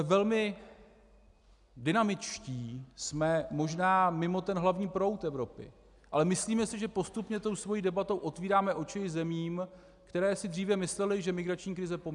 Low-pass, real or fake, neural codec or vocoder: 10.8 kHz; real; none